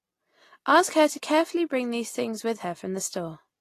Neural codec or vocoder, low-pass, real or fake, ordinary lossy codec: none; 14.4 kHz; real; AAC, 48 kbps